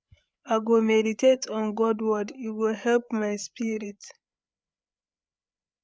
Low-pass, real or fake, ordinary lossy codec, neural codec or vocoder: none; fake; none; codec, 16 kHz, 8 kbps, FreqCodec, larger model